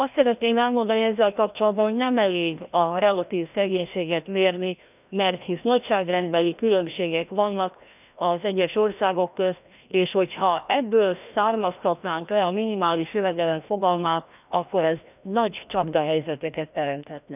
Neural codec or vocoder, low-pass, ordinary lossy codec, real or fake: codec, 16 kHz, 1 kbps, FreqCodec, larger model; 3.6 kHz; none; fake